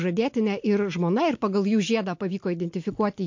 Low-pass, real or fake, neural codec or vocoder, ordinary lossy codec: 7.2 kHz; real; none; MP3, 48 kbps